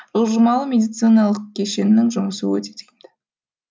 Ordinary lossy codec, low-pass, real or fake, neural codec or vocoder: none; none; real; none